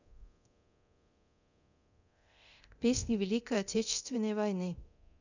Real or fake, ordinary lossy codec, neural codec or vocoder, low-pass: fake; none; codec, 24 kHz, 0.9 kbps, DualCodec; 7.2 kHz